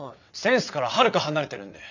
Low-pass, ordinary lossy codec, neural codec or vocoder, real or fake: 7.2 kHz; none; vocoder, 22.05 kHz, 80 mel bands, WaveNeXt; fake